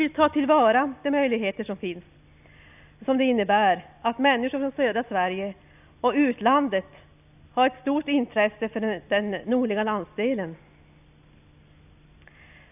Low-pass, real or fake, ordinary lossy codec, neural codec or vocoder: 3.6 kHz; real; none; none